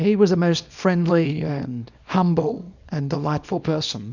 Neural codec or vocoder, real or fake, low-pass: codec, 24 kHz, 0.9 kbps, WavTokenizer, small release; fake; 7.2 kHz